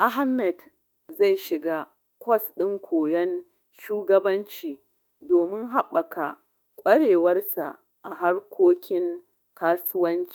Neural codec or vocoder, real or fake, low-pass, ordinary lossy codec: autoencoder, 48 kHz, 32 numbers a frame, DAC-VAE, trained on Japanese speech; fake; none; none